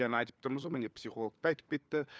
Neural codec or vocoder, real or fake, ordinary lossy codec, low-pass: codec, 16 kHz, 4 kbps, FunCodec, trained on LibriTTS, 50 frames a second; fake; none; none